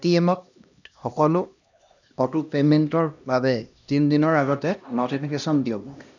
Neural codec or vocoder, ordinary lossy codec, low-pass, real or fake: codec, 16 kHz, 1 kbps, X-Codec, HuBERT features, trained on LibriSpeech; none; 7.2 kHz; fake